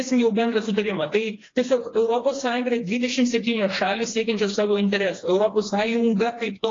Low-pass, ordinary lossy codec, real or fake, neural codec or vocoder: 7.2 kHz; AAC, 32 kbps; fake; codec, 16 kHz, 2 kbps, FreqCodec, smaller model